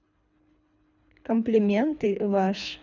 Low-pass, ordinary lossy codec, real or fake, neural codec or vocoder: 7.2 kHz; none; fake; codec, 24 kHz, 3 kbps, HILCodec